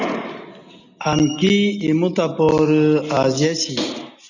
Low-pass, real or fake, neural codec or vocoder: 7.2 kHz; real; none